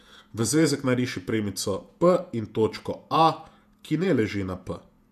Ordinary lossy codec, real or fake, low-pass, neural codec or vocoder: none; fake; 14.4 kHz; vocoder, 48 kHz, 128 mel bands, Vocos